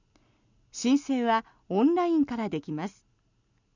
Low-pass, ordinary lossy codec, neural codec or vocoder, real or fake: 7.2 kHz; none; none; real